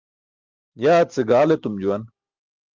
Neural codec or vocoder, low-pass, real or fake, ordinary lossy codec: none; 7.2 kHz; real; Opus, 24 kbps